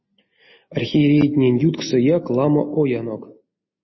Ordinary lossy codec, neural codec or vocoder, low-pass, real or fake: MP3, 24 kbps; none; 7.2 kHz; real